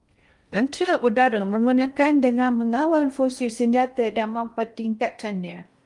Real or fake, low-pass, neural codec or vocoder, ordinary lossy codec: fake; 10.8 kHz; codec, 16 kHz in and 24 kHz out, 0.6 kbps, FocalCodec, streaming, 2048 codes; Opus, 32 kbps